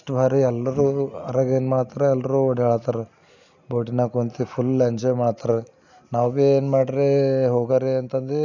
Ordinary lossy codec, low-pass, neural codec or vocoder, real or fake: none; 7.2 kHz; none; real